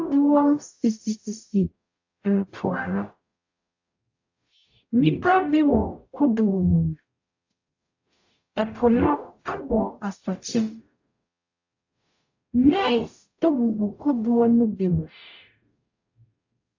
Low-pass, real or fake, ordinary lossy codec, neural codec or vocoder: 7.2 kHz; fake; AAC, 48 kbps; codec, 44.1 kHz, 0.9 kbps, DAC